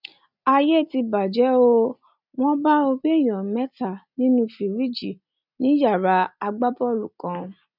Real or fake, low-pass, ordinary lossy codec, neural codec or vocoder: real; 5.4 kHz; none; none